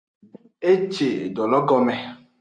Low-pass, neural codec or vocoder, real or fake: 9.9 kHz; none; real